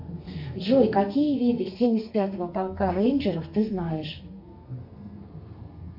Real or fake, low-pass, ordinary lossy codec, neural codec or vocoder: fake; 5.4 kHz; MP3, 48 kbps; codec, 32 kHz, 1.9 kbps, SNAC